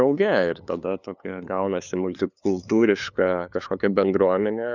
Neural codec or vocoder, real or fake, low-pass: codec, 16 kHz, 2 kbps, FunCodec, trained on LibriTTS, 25 frames a second; fake; 7.2 kHz